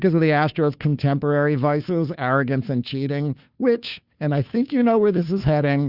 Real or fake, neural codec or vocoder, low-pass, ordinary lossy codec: fake; codec, 16 kHz, 2 kbps, FunCodec, trained on Chinese and English, 25 frames a second; 5.4 kHz; Opus, 64 kbps